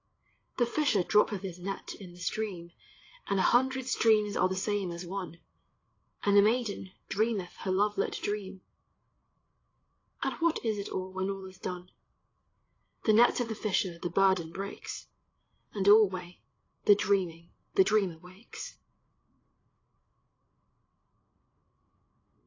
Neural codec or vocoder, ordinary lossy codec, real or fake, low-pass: codec, 16 kHz, 8 kbps, FreqCodec, larger model; AAC, 32 kbps; fake; 7.2 kHz